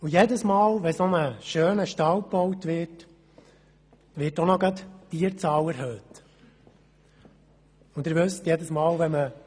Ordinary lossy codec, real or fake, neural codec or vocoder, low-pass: none; real; none; none